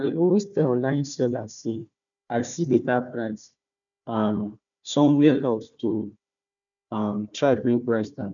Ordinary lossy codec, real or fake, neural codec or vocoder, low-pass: none; fake; codec, 16 kHz, 1 kbps, FunCodec, trained on Chinese and English, 50 frames a second; 7.2 kHz